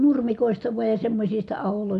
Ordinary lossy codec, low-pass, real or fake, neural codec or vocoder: none; 10.8 kHz; real; none